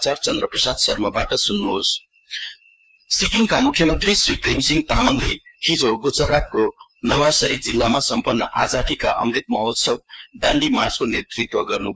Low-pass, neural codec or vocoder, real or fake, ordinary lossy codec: none; codec, 16 kHz, 2 kbps, FreqCodec, larger model; fake; none